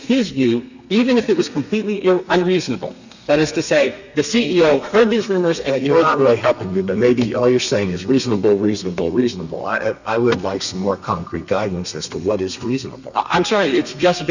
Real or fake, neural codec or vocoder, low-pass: fake; codec, 32 kHz, 1.9 kbps, SNAC; 7.2 kHz